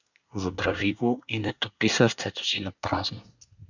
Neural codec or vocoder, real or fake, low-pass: codec, 24 kHz, 1 kbps, SNAC; fake; 7.2 kHz